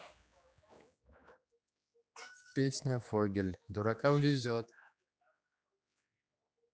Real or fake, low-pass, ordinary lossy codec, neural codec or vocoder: fake; none; none; codec, 16 kHz, 2 kbps, X-Codec, HuBERT features, trained on general audio